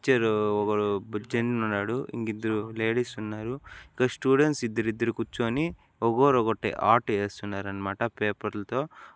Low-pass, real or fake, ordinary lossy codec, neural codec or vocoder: none; real; none; none